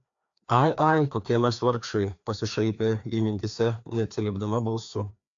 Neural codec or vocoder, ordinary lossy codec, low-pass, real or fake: codec, 16 kHz, 2 kbps, FreqCodec, larger model; AAC, 64 kbps; 7.2 kHz; fake